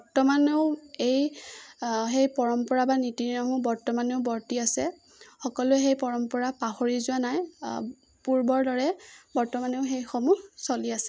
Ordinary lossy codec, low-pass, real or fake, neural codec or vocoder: none; none; real; none